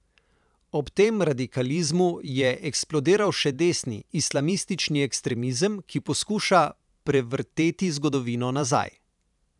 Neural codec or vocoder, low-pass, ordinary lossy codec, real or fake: vocoder, 44.1 kHz, 128 mel bands every 512 samples, BigVGAN v2; 10.8 kHz; none; fake